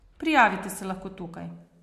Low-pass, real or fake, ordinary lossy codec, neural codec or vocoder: 14.4 kHz; real; MP3, 64 kbps; none